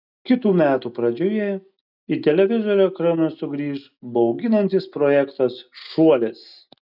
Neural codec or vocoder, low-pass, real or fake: none; 5.4 kHz; real